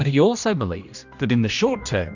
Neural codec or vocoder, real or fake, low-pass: codec, 16 kHz, 1 kbps, X-Codec, HuBERT features, trained on general audio; fake; 7.2 kHz